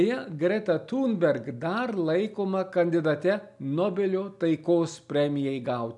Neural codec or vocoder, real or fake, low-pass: none; real; 10.8 kHz